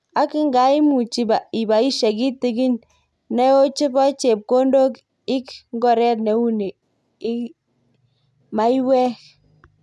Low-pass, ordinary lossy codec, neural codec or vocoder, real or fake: none; none; none; real